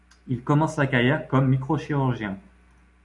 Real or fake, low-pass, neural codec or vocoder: real; 10.8 kHz; none